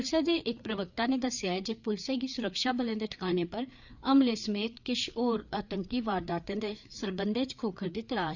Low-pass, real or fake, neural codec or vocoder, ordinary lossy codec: 7.2 kHz; fake; codec, 16 kHz, 4 kbps, FreqCodec, larger model; none